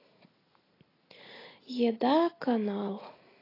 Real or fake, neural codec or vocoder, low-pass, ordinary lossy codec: real; none; 5.4 kHz; AAC, 24 kbps